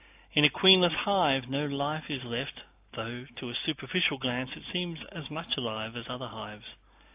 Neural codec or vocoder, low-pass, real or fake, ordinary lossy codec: none; 3.6 kHz; real; AAC, 32 kbps